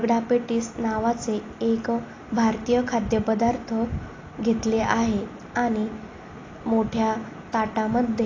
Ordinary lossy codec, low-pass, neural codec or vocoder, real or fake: AAC, 32 kbps; 7.2 kHz; none; real